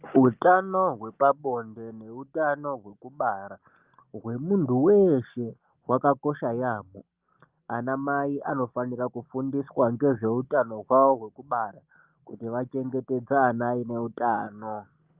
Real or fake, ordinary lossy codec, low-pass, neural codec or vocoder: real; Opus, 32 kbps; 3.6 kHz; none